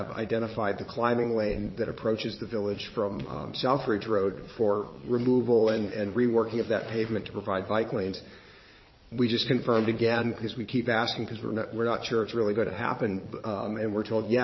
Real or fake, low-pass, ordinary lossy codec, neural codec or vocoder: fake; 7.2 kHz; MP3, 24 kbps; vocoder, 22.05 kHz, 80 mel bands, Vocos